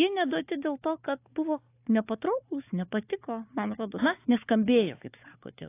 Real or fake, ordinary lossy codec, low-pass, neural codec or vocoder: fake; AAC, 24 kbps; 3.6 kHz; codec, 16 kHz, 4 kbps, FunCodec, trained on Chinese and English, 50 frames a second